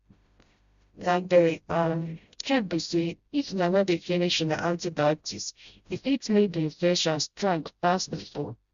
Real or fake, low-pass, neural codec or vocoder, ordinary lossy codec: fake; 7.2 kHz; codec, 16 kHz, 0.5 kbps, FreqCodec, smaller model; Opus, 64 kbps